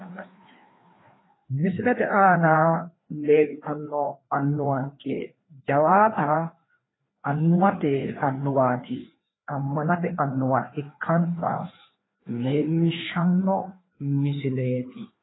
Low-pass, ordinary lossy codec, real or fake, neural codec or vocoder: 7.2 kHz; AAC, 16 kbps; fake; codec, 16 kHz, 2 kbps, FreqCodec, larger model